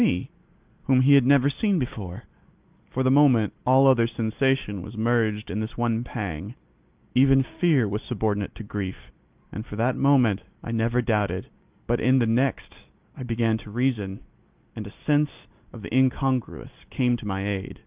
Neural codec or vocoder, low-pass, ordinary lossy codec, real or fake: none; 3.6 kHz; Opus, 32 kbps; real